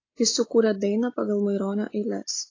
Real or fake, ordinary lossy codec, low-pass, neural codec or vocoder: real; AAC, 32 kbps; 7.2 kHz; none